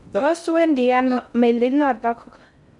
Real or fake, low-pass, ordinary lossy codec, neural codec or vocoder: fake; 10.8 kHz; none; codec, 16 kHz in and 24 kHz out, 0.6 kbps, FocalCodec, streaming, 2048 codes